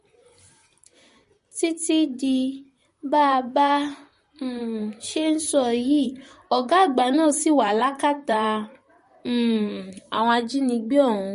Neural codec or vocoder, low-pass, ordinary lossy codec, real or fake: vocoder, 44.1 kHz, 128 mel bands, Pupu-Vocoder; 14.4 kHz; MP3, 48 kbps; fake